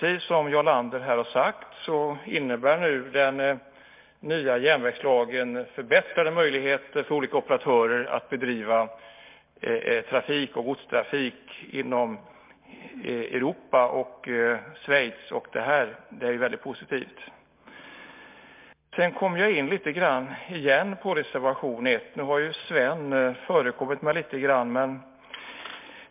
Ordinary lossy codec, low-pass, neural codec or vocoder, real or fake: MP3, 32 kbps; 3.6 kHz; none; real